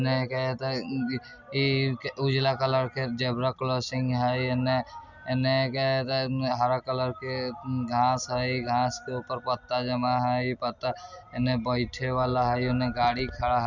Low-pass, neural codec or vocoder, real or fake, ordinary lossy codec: 7.2 kHz; none; real; none